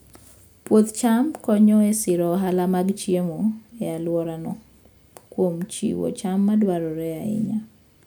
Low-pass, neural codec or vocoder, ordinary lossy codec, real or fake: none; none; none; real